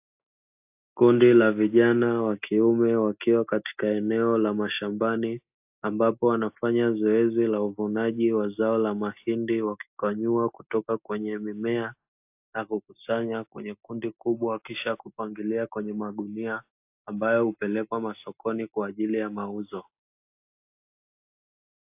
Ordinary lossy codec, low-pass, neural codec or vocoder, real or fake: AAC, 32 kbps; 3.6 kHz; none; real